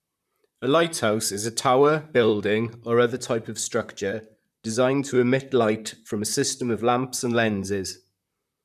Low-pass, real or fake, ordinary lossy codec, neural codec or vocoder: 14.4 kHz; fake; none; vocoder, 44.1 kHz, 128 mel bands, Pupu-Vocoder